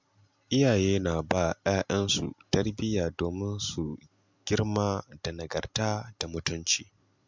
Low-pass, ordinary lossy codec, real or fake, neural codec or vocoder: 7.2 kHz; MP3, 64 kbps; real; none